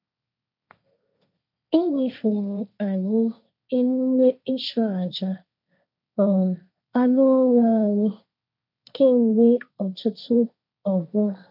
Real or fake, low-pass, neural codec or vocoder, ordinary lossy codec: fake; 5.4 kHz; codec, 16 kHz, 1.1 kbps, Voila-Tokenizer; none